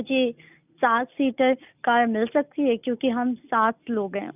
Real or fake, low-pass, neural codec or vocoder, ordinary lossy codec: real; 3.6 kHz; none; none